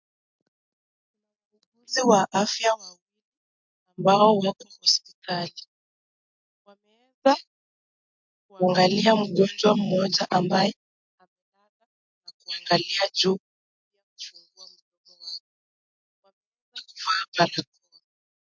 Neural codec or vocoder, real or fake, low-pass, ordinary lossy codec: none; real; 7.2 kHz; MP3, 64 kbps